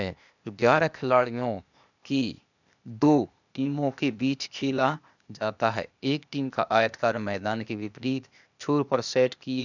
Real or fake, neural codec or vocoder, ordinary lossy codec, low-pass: fake; codec, 16 kHz, 0.8 kbps, ZipCodec; none; 7.2 kHz